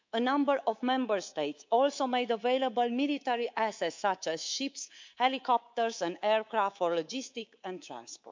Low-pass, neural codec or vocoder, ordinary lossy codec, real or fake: 7.2 kHz; codec, 24 kHz, 3.1 kbps, DualCodec; MP3, 64 kbps; fake